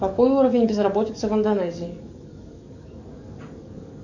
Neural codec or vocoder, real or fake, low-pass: codec, 44.1 kHz, 7.8 kbps, DAC; fake; 7.2 kHz